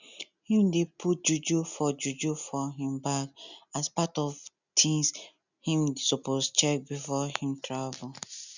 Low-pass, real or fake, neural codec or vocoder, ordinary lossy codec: 7.2 kHz; real; none; none